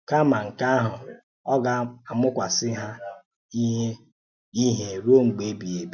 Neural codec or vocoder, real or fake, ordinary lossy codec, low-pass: none; real; none; 7.2 kHz